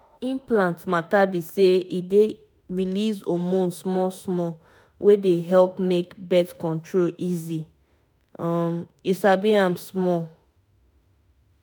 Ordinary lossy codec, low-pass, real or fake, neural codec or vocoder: none; none; fake; autoencoder, 48 kHz, 32 numbers a frame, DAC-VAE, trained on Japanese speech